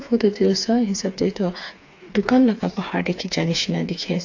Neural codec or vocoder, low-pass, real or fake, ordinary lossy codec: codec, 16 kHz, 4 kbps, FreqCodec, smaller model; 7.2 kHz; fake; AAC, 48 kbps